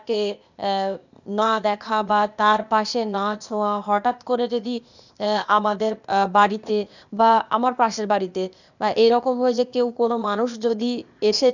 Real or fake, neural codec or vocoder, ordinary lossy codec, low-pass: fake; codec, 16 kHz, 0.8 kbps, ZipCodec; none; 7.2 kHz